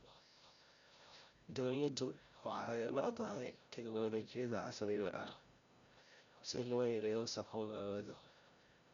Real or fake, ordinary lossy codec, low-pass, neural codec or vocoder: fake; Opus, 64 kbps; 7.2 kHz; codec, 16 kHz, 0.5 kbps, FreqCodec, larger model